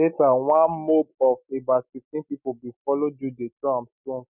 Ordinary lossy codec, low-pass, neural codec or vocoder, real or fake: none; 3.6 kHz; none; real